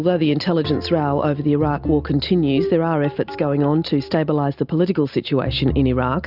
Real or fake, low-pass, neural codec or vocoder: real; 5.4 kHz; none